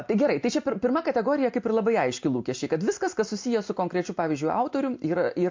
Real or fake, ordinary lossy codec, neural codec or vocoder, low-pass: real; MP3, 48 kbps; none; 7.2 kHz